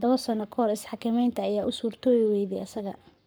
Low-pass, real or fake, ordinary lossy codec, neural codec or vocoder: none; fake; none; codec, 44.1 kHz, 7.8 kbps, Pupu-Codec